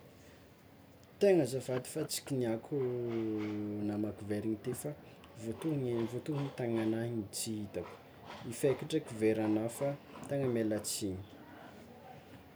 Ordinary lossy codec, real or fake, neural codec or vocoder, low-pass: none; real; none; none